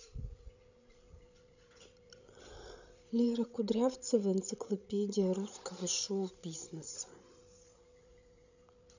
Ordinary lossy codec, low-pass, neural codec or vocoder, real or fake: none; 7.2 kHz; codec, 16 kHz, 16 kbps, FreqCodec, larger model; fake